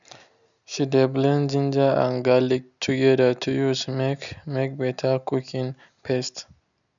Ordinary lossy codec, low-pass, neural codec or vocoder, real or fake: none; 7.2 kHz; none; real